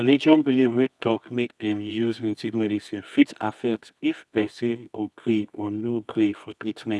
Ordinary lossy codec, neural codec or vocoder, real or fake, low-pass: none; codec, 24 kHz, 0.9 kbps, WavTokenizer, medium music audio release; fake; none